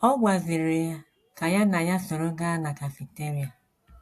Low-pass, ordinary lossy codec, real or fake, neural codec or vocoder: 14.4 kHz; AAC, 64 kbps; real; none